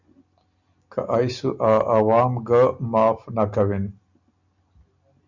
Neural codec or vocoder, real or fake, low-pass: none; real; 7.2 kHz